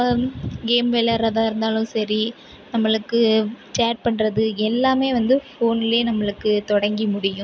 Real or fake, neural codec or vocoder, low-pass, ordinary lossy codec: real; none; none; none